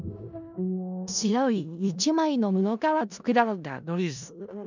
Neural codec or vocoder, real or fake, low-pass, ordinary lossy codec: codec, 16 kHz in and 24 kHz out, 0.4 kbps, LongCat-Audio-Codec, four codebook decoder; fake; 7.2 kHz; none